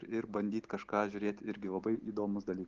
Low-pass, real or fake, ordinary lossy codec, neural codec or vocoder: 7.2 kHz; real; Opus, 16 kbps; none